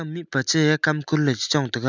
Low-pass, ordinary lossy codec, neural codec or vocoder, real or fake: 7.2 kHz; none; none; real